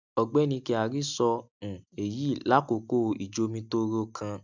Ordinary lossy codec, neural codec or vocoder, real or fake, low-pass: none; none; real; 7.2 kHz